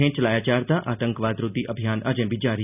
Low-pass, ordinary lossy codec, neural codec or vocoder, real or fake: 3.6 kHz; none; none; real